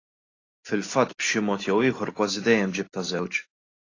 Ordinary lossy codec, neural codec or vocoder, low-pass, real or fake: AAC, 32 kbps; none; 7.2 kHz; real